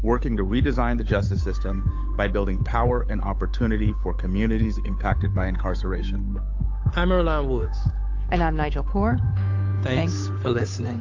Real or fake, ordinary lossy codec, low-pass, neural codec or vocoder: fake; AAC, 48 kbps; 7.2 kHz; codec, 16 kHz, 8 kbps, FunCodec, trained on Chinese and English, 25 frames a second